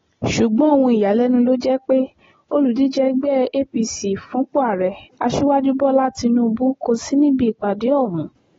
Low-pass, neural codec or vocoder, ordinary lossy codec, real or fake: 7.2 kHz; none; AAC, 24 kbps; real